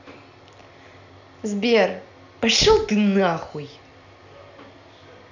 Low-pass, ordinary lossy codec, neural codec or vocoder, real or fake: 7.2 kHz; none; none; real